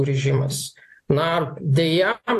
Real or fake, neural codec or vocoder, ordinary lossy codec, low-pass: fake; vocoder, 44.1 kHz, 128 mel bands every 256 samples, BigVGAN v2; AAC, 64 kbps; 14.4 kHz